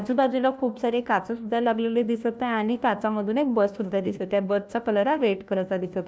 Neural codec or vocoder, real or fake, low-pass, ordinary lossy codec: codec, 16 kHz, 1 kbps, FunCodec, trained on LibriTTS, 50 frames a second; fake; none; none